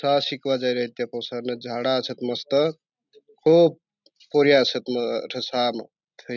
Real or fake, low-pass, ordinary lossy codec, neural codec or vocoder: real; 7.2 kHz; none; none